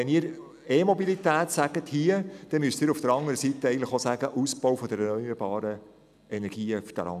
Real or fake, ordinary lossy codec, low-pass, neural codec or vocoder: real; none; 14.4 kHz; none